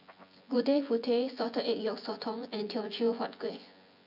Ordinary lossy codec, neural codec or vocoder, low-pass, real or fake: none; vocoder, 24 kHz, 100 mel bands, Vocos; 5.4 kHz; fake